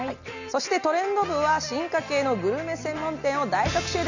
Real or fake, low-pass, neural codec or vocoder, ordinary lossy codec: real; 7.2 kHz; none; none